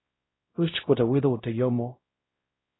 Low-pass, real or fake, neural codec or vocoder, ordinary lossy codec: 7.2 kHz; fake; codec, 16 kHz, 0.5 kbps, X-Codec, WavLM features, trained on Multilingual LibriSpeech; AAC, 16 kbps